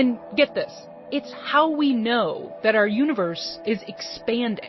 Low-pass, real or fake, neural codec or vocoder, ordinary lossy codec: 7.2 kHz; real; none; MP3, 24 kbps